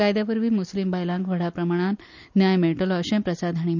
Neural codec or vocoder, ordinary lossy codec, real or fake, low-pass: none; none; real; 7.2 kHz